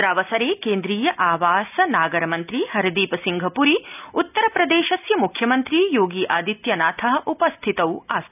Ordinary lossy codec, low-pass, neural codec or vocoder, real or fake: none; 3.6 kHz; none; real